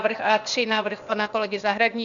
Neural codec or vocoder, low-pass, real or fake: codec, 16 kHz, 0.8 kbps, ZipCodec; 7.2 kHz; fake